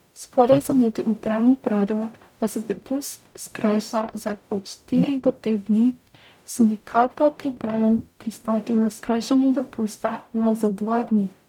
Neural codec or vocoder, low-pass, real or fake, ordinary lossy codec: codec, 44.1 kHz, 0.9 kbps, DAC; 19.8 kHz; fake; none